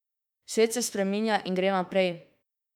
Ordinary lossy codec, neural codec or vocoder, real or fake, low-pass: none; autoencoder, 48 kHz, 32 numbers a frame, DAC-VAE, trained on Japanese speech; fake; 19.8 kHz